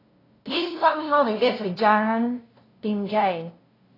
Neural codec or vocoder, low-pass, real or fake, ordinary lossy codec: codec, 16 kHz, 0.5 kbps, FunCodec, trained on LibriTTS, 25 frames a second; 5.4 kHz; fake; AAC, 24 kbps